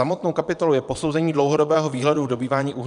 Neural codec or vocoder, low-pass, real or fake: vocoder, 22.05 kHz, 80 mel bands, Vocos; 9.9 kHz; fake